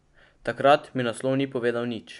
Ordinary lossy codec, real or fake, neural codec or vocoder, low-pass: none; real; none; 10.8 kHz